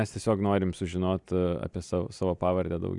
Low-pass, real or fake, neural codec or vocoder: 10.8 kHz; real; none